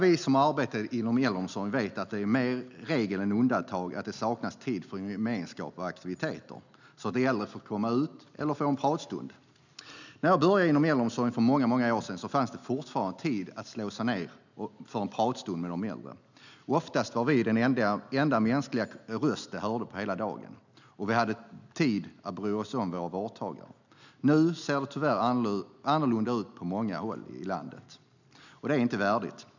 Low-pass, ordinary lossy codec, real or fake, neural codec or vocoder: 7.2 kHz; none; real; none